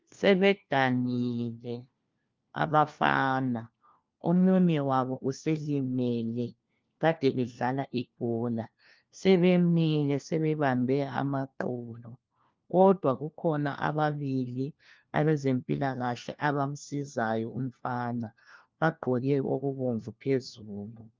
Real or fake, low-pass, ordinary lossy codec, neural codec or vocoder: fake; 7.2 kHz; Opus, 24 kbps; codec, 16 kHz, 1 kbps, FunCodec, trained on LibriTTS, 50 frames a second